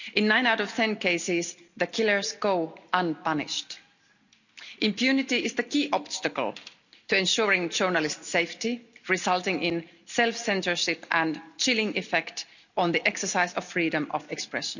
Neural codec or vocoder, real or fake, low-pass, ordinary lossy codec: none; real; 7.2 kHz; none